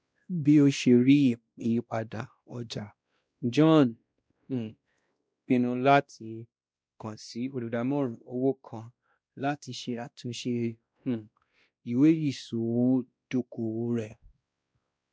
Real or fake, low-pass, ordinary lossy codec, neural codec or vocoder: fake; none; none; codec, 16 kHz, 1 kbps, X-Codec, WavLM features, trained on Multilingual LibriSpeech